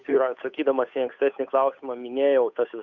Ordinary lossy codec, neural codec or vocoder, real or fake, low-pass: Opus, 64 kbps; codec, 16 kHz, 8 kbps, FunCodec, trained on Chinese and English, 25 frames a second; fake; 7.2 kHz